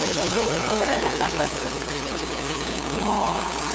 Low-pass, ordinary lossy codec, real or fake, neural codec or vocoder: none; none; fake; codec, 16 kHz, 2 kbps, FunCodec, trained on LibriTTS, 25 frames a second